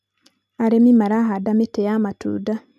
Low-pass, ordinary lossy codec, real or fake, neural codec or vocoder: 14.4 kHz; none; real; none